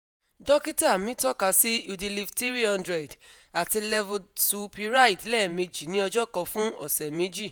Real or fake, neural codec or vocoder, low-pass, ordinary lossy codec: fake; vocoder, 48 kHz, 128 mel bands, Vocos; none; none